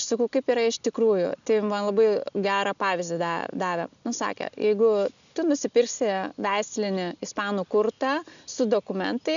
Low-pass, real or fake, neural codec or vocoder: 7.2 kHz; real; none